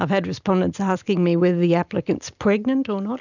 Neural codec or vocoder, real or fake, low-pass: none; real; 7.2 kHz